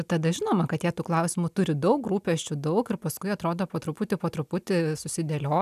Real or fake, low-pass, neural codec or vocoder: real; 14.4 kHz; none